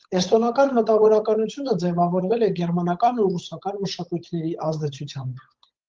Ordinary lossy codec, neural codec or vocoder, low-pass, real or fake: Opus, 32 kbps; codec, 16 kHz, 8 kbps, FunCodec, trained on Chinese and English, 25 frames a second; 7.2 kHz; fake